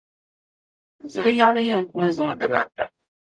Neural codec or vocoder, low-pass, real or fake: codec, 44.1 kHz, 0.9 kbps, DAC; 9.9 kHz; fake